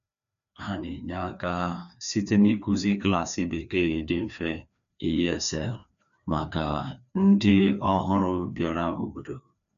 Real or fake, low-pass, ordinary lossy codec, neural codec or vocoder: fake; 7.2 kHz; none; codec, 16 kHz, 2 kbps, FreqCodec, larger model